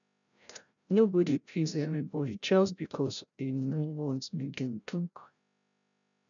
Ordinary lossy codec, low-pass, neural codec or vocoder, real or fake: none; 7.2 kHz; codec, 16 kHz, 0.5 kbps, FreqCodec, larger model; fake